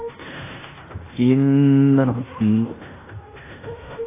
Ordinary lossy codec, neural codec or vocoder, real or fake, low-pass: AAC, 16 kbps; codec, 16 kHz in and 24 kHz out, 0.9 kbps, LongCat-Audio-Codec, fine tuned four codebook decoder; fake; 3.6 kHz